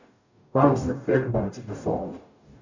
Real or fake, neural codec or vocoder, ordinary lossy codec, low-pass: fake; codec, 44.1 kHz, 0.9 kbps, DAC; none; 7.2 kHz